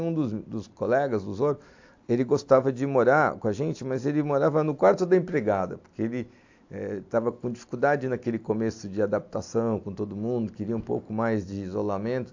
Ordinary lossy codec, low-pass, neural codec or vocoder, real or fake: AAC, 48 kbps; 7.2 kHz; none; real